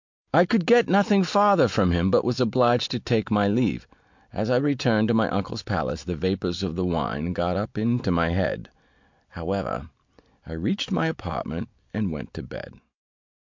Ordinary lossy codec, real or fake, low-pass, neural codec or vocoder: MP3, 64 kbps; real; 7.2 kHz; none